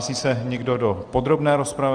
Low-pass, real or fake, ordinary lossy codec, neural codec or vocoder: 9.9 kHz; real; Opus, 24 kbps; none